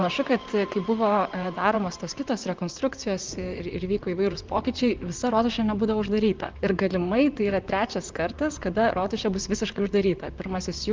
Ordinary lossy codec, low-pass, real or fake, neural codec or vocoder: Opus, 24 kbps; 7.2 kHz; fake; vocoder, 44.1 kHz, 128 mel bands, Pupu-Vocoder